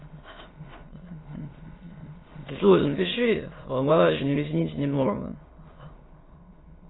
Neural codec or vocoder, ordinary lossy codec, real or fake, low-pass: autoencoder, 22.05 kHz, a latent of 192 numbers a frame, VITS, trained on many speakers; AAC, 16 kbps; fake; 7.2 kHz